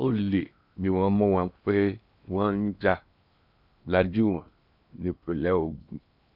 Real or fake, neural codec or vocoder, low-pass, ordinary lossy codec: fake; codec, 16 kHz in and 24 kHz out, 0.8 kbps, FocalCodec, streaming, 65536 codes; 5.4 kHz; none